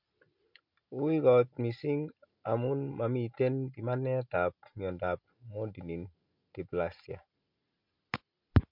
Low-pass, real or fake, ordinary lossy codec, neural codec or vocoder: 5.4 kHz; real; MP3, 48 kbps; none